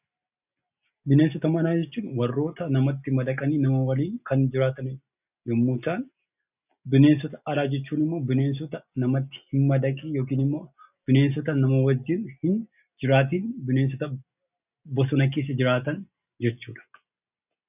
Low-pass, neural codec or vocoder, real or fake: 3.6 kHz; none; real